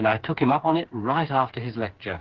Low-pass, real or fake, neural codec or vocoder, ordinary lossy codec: 7.2 kHz; fake; codec, 16 kHz, 4 kbps, FreqCodec, smaller model; Opus, 16 kbps